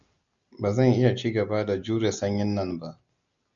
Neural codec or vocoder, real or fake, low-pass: none; real; 7.2 kHz